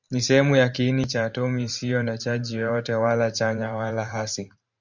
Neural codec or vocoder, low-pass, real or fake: vocoder, 44.1 kHz, 128 mel bands every 512 samples, BigVGAN v2; 7.2 kHz; fake